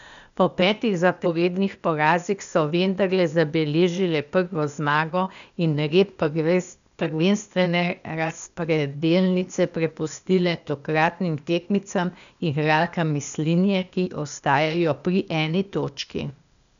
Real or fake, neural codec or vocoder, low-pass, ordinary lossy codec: fake; codec, 16 kHz, 0.8 kbps, ZipCodec; 7.2 kHz; none